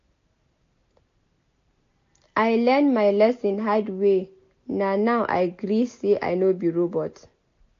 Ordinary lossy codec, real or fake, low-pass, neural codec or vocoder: AAC, 48 kbps; real; 7.2 kHz; none